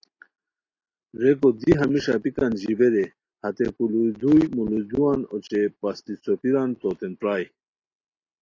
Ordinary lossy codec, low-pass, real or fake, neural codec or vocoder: AAC, 32 kbps; 7.2 kHz; real; none